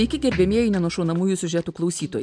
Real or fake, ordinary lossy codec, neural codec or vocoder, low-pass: real; MP3, 96 kbps; none; 9.9 kHz